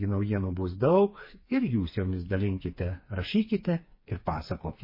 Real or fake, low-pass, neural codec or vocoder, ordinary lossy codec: fake; 5.4 kHz; codec, 16 kHz, 4 kbps, FreqCodec, smaller model; MP3, 24 kbps